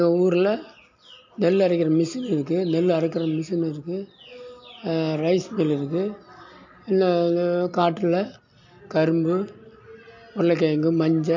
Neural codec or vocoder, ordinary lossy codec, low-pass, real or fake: none; MP3, 48 kbps; 7.2 kHz; real